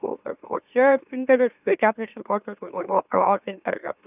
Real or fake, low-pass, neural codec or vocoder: fake; 3.6 kHz; autoencoder, 44.1 kHz, a latent of 192 numbers a frame, MeloTTS